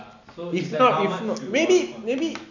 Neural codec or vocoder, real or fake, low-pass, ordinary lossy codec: none; real; 7.2 kHz; none